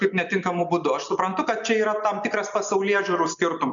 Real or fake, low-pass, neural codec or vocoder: real; 7.2 kHz; none